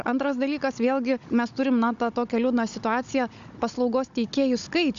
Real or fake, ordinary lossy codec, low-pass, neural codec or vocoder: fake; Opus, 64 kbps; 7.2 kHz; codec, 16 kHz, 16 kbps, FunCodec, trained on Chinese and English, 50 frames a second